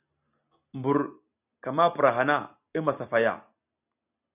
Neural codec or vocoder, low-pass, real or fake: none; 3.6 kHz; real